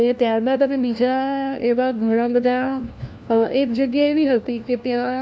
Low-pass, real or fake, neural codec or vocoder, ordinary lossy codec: none; fake; codec, 16 kHz, 1 kbps, FunCodec, trained on LibriTTS, 50 frames a second; none